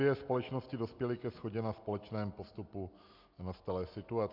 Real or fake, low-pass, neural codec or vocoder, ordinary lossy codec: real; 5.4 kHz; none; MP3, 48 kbps